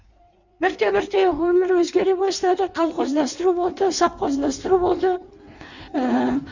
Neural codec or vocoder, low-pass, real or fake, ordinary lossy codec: codec, 16 kHz in and 24 kHz out, 1.1 kbps, FireRedTTS-2 codec; 7.2 kHz; fake; none